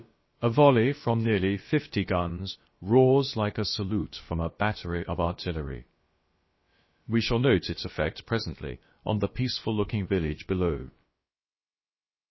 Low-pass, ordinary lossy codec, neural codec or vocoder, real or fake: 7.2 kHz; MP3, 24 kbps; codec, 16 kHz, about 1 kbps, DyCAST, with the encoder's durations; fake